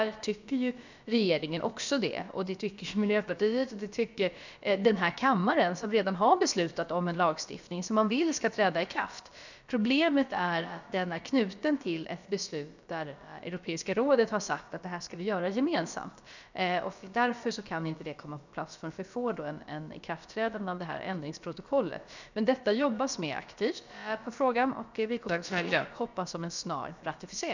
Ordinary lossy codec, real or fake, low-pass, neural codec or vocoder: none; fake; 7.2 kHz; codec, 16 kHz, about 1 kbps, DyCAST, with the encoder's durations